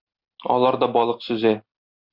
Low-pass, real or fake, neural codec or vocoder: 5.4 kHz; real; none